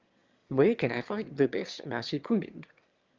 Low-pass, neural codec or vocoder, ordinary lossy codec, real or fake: 7.2 kHz; autoencoder, 22.05 kHz, a latent of 192 numbers a frame, VITS, trained on one speaker; Opus, 32 kbps; fake